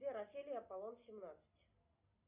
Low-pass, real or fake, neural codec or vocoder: 3.6 kHz; real; none